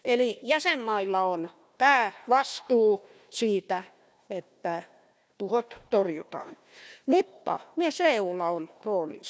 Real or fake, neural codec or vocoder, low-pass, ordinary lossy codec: fake; codec, 16 kHz, 1 kbps, FunCodec, trained on Chinese and English, 50 frames a second; none; none